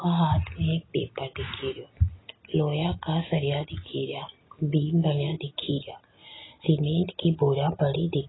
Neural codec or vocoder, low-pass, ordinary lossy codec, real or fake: none; 7.2 kHz; AAC, 16 kbps; real